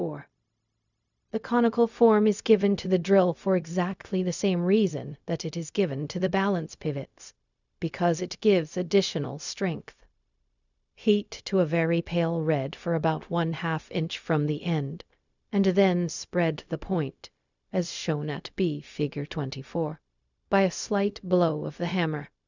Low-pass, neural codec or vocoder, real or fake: 7.2 kHz; codec, 16 kHz, 0.4 kbps, LongCat-Audio-Codec; fake